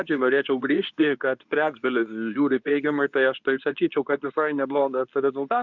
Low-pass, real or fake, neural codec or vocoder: 7.2 kHz; fake; codec, 24 kHz, 0.9 kbps, WavTokenizer, medium speech release version 2